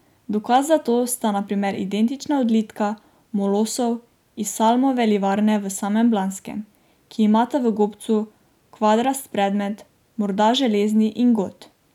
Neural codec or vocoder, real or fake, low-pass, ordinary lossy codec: none; real; 19.8 kHz; none